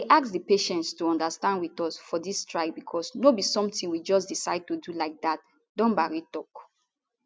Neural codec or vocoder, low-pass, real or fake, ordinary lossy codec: none; none; real; none